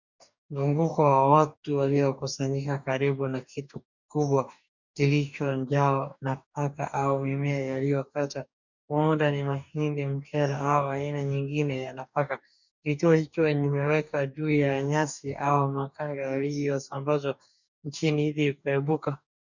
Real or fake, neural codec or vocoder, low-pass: fake; codec, 44.1 kHz, 2.6 kbps, DAC; 7.2 kHz